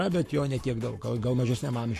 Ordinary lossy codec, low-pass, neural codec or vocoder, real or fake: AAC, 64 kbps; 14.4 kHz; codec, 44.1 kHz, 7.8 kbps, Pupu-Codec; fake